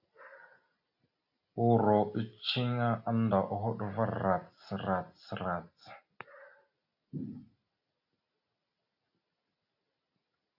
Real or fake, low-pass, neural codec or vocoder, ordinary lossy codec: real; 5.4 kHz; none; AAC, 48 kbps